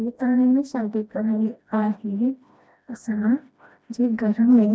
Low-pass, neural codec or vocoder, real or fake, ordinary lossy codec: none; codec, 16 kHz, 1 kbps, FreqCodec, smaller model; fake; none